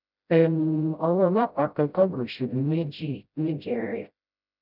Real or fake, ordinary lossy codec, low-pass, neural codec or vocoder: fake; none; 5.4 kHz; codec, 16 kHz, 0.5 kbps, FreqCodec, smaller model